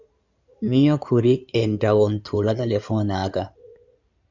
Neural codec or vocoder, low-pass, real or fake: codec, 16 kHz in and 24 kHz out, 2.2 kbps, FireRedTTS-2 codec; 7.2 kHz; fake